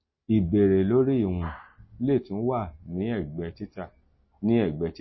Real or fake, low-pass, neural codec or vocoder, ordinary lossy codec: real; 7.2 kHz; none; MP3, 24 kbps